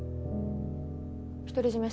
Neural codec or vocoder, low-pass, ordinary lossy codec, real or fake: none; none; none; real